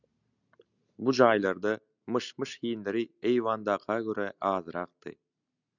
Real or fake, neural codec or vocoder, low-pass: real; none; 7.2 kHz